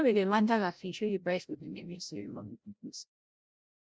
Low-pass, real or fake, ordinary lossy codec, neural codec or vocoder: none; fake; none; codec, 16 kHz, 0.5 kbps, FreqCodec, larger model